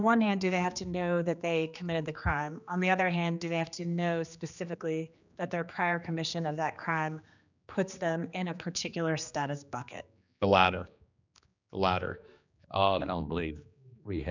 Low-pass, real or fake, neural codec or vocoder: 7.2 kHz; fake; codec, 16 kHz, 2 kbps, X-Codec, HuBERT features, trained on general audio